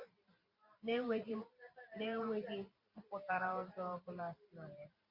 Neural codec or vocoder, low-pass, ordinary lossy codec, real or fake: vocoder, 44.1 kHz, 128 mel bands every 512 samples, BigVGAN v2; 5.4 kHz; Opus, 64 kbps; fake